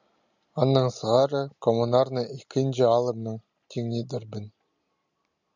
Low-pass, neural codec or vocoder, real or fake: 7.2 kHz; none; real